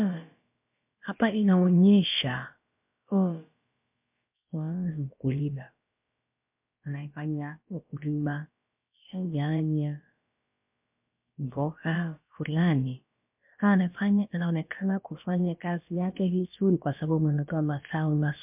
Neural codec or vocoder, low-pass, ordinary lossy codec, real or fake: codec, 16 kHz, about 1 kbps, DyCAST, with the encoder's durations; 3.6 kHz; MP3, 32 kbps; fake